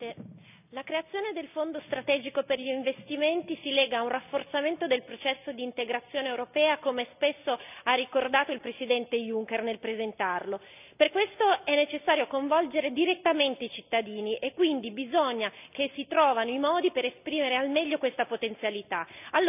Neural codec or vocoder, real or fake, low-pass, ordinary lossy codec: none; real; 3.6 kHz; none